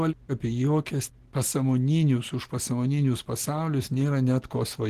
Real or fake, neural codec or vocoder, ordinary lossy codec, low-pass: real; none; Opus, 16 kbps; 14.4 kHz